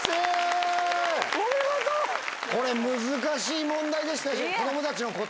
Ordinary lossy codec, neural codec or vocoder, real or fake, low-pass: none; none; real; none